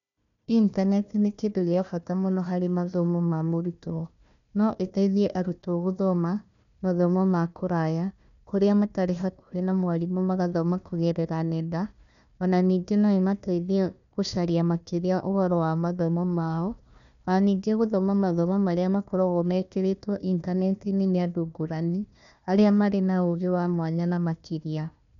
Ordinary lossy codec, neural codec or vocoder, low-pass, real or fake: none; codec, 16 kHz, 1 kbps, FunCodec, trained on Chinese and English, 50 frames a second; 7.2 kHz; fake